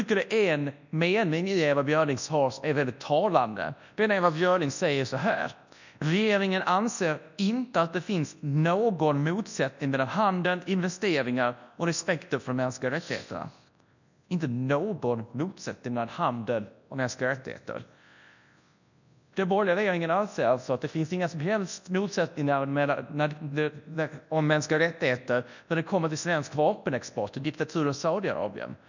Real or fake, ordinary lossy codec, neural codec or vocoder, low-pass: fake; none; codec, 24 kHz, 0.9 kbps, WavTokenizer, large speech release; 7.2 kHz